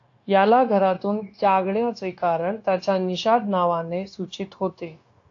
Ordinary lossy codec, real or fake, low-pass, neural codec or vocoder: AAC, 48 kbps; fake; 7.2 kHz; codec, 16 kHz, 0.9 kbps, LongCat-Audio-Codec